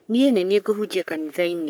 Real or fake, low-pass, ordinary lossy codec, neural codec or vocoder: fake; none; none; codec, 44.1 kHz, 3.4 kbps, Pupu-Codec